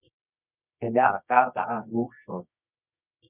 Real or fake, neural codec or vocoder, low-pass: fake; codec, 24 kHz, 0.9 kbps, WavTokenizer, medium music audio release; 3.6 kHz